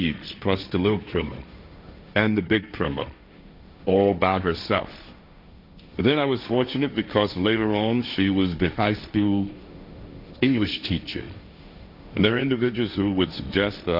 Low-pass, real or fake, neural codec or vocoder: 5.4 kHz; fake; codec, 16 kHz, 1.1 kbps, Voila-Tokenizer